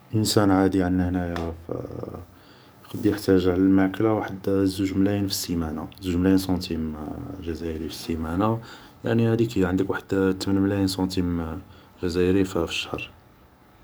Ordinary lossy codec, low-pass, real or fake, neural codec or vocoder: none; none; fake; codec, 44.1 kHz, 7.8 kbps, DAC